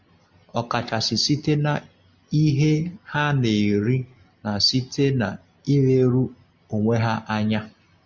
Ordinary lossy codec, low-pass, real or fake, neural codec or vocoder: MP3, 64 kbps; 7.2 kHz; real; none